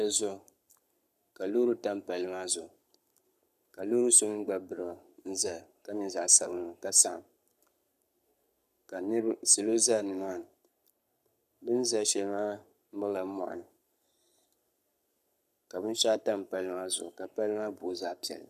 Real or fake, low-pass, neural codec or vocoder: fake; 14.4 kHz; codec, 44.1 kHz, 7.8 kbps, Pupu-Codec